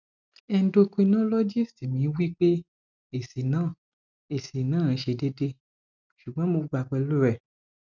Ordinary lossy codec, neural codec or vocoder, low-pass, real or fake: none; none; 7.2 kHz; real